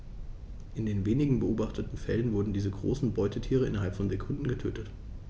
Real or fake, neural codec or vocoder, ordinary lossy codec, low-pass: real; none; none; none